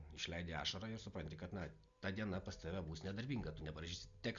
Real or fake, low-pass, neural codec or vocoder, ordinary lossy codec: real; 7.2 kHz; none; Opus, 64 kbps